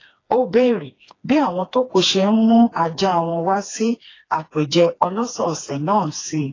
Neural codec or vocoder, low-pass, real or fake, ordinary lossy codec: codec, 16 kHz, 2 kbps, FreqCodec, smaller model; 7.2 kHz; fake; AAC, 32 kbps